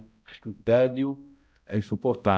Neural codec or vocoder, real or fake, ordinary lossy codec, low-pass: codec, 16 kHz, 0.5 kbps, X-Codec, HuBERT features, trained on balanced general audio; fake; none; none